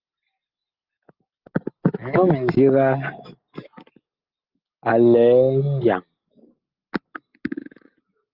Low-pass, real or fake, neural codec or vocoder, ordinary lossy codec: 5.4 kHz; real; none; Opus, 32 kbps